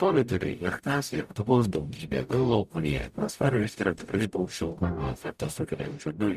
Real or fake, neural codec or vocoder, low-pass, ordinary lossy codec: fake; codec, 44.1 kHz, 0.9 kbps, DAC; 14.4 kHz; Opus, 64 kbps